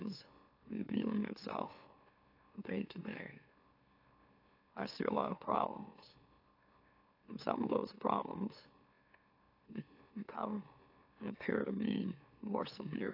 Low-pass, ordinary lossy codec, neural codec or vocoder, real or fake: 5.4 kHz; AAC, 32 kbps; autoencoder, 44.1 kHz, a latent of 192 numbers a frame, MeloTTS; fake